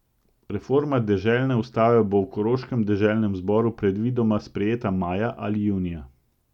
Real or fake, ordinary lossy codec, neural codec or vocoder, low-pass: real; none; none; 19.8 kHz